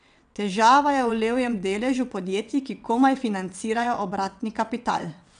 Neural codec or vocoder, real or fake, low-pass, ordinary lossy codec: vocoder, 22.05 kHz, 80 mel bands, WaveNeXt; fake; 9.9 kHz; none